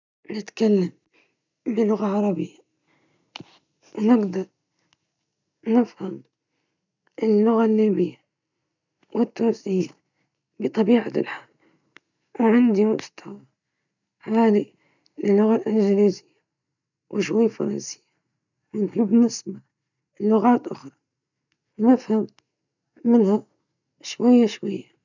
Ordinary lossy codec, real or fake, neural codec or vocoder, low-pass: none; real; none; 7.2 kHz